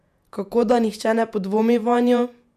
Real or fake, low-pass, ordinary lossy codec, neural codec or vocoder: fake; 14.4 kHz; none; vocoder, 48 kHz, 128 mel bands, Vocos